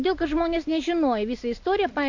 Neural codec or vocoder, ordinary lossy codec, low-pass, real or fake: none; AAC, 48 kbps; 7.2 kHz; real